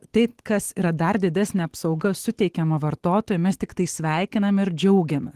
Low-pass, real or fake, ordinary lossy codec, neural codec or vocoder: 14.4 kHz; fake; Opus, 16 kbps; autoencoder, 48 kHz, 128 numbers a frame, DAC-VAE, trained on Japanese speech